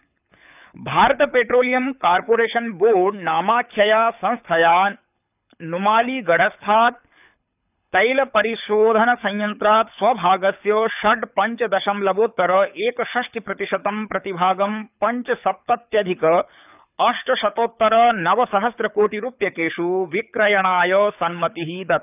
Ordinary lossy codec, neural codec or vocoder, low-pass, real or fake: none; codec, 24 kHz, 6 kbps, HILCodec; 3.6 kHz; fake